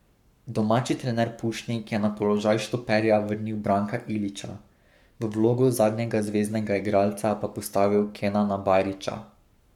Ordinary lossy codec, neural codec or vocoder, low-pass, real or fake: none; codec, 44.1 kHz, 7.8 kbps, Pupu-Codec; 19.8 kHz; fake